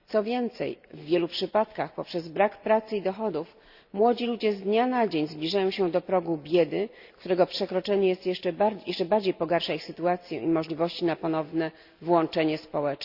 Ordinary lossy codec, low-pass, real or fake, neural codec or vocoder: Opus, 64 kbps; 5.4 kHz; real; none